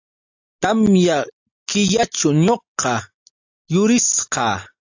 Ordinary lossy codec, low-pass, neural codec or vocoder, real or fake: AAC, 48 kbps; 7.2 kHz; none; real